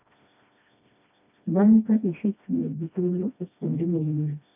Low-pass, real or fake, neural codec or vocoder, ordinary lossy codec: 3.6 kHz; fake; codec, 16 kHz, 1 kbps, FreqCodec, smaller model; none